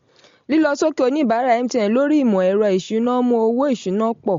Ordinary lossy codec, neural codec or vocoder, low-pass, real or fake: MP3, 48 kbps; none; 7.2 kHz; real